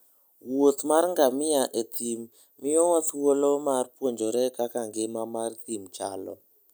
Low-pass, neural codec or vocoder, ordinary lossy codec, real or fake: none; none; none; real